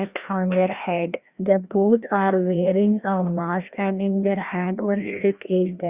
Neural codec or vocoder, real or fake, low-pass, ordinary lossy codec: codec, 16 kHz, 1 kbps, FreqCodec, larger model; fake; 3.6 kHz; Opus, 64 kbps